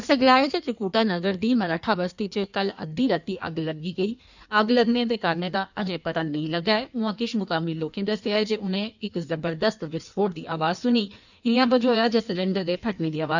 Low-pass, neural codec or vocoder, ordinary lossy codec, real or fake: 7.2 kHz; codec, 16 kHz in and 24 kHz out, 1.1 kbps, FireRedTTS-2 codec; none; fake